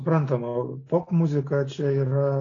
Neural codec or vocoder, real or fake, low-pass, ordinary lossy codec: none; real; 7.2 kHz; AAC, 32 kbps